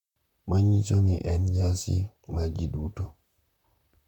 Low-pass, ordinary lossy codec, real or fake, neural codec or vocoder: 19.8 kHz; none; fake; vocoder, 44.1 kHz, 128 mel bands, Pupu-Vocoder